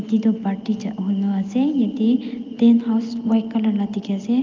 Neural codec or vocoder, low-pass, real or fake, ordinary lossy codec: none; 7.2 kHz; real; Opus, 24 kbps